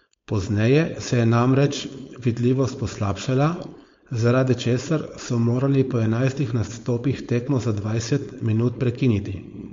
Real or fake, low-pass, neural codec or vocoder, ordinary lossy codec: fake; 7.2 kHz; codec, 16 kHz, 4.8 kbps, FACodec; MP3, 48 kbps